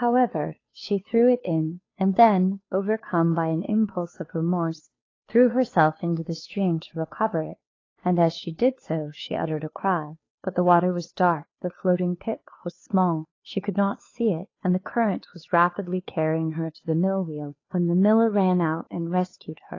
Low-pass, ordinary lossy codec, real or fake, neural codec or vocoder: 7.2 kHz; AAC, 32 kbps; fake; codec, 16 kHz, 2 kbps, FunCodec, trained on Chinese and English, 25 frames a second